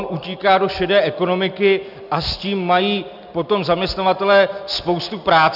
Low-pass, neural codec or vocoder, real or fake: 5.4 kHz; none; real